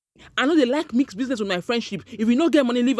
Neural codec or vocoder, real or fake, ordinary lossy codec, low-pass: none; real; none; none